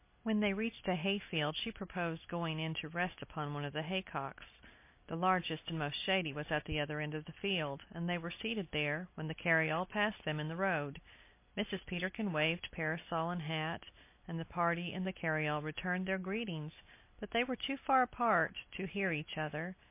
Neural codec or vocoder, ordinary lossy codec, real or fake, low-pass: none; MP3, 24 kbps; real; 3.6 kHz